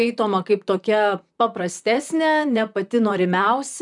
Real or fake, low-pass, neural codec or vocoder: fake; 10.8 kHz; vocoder, 44.1 kHz, 128 mel bands, Pupu-Vocoder